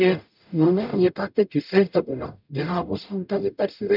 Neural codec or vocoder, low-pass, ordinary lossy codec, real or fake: codec, 44.1 kHz, 0.9 kbps, DAC; 5.4 kHz; none; fake